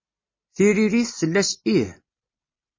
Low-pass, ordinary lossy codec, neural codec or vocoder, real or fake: 7.2 kHz; MP3, 32 kbps; none; real